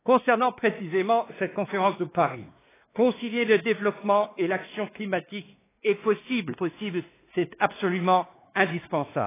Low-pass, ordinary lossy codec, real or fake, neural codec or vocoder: 3.6 kHz; AAC, 16 kbps; fake; codec, 16 kHz, 2 kbps, X-Codec, WavLM features, trained on Multilingual LibriSpeech